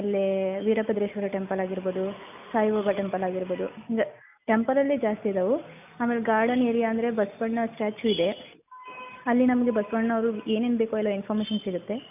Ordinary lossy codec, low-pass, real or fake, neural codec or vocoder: none; 3.6 kHz; real; none